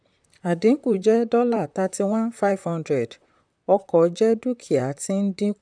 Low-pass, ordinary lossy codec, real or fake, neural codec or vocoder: 9.9 kHz; none; fake; vocoder, 44.1 kHz, 128 mel bands, Pupu-Vocoder